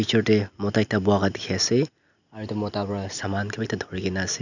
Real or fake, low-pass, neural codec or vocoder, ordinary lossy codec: real; 7.2 kHz; none; none